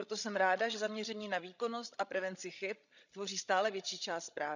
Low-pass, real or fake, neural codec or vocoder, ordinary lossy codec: 7.2 kHz; fake; codec, 16 kHz, 16 kbps, FreqCodec, larger model; none